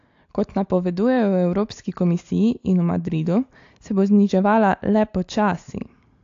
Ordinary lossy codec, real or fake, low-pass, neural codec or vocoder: AAC, 48 kbps; real; 7.2 kHz; none